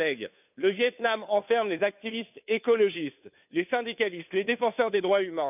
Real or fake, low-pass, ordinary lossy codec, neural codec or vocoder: fake; 3.6 kHz; none; codec, 16 kHz, 2 kbps, FunCodec, trained on Chinese and English, 25 frames a second